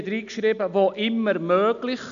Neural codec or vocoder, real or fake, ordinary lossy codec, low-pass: none; real; none; 7.2 kHz